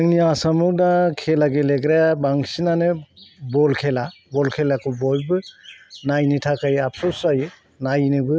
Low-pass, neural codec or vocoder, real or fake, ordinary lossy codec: none; none; real; none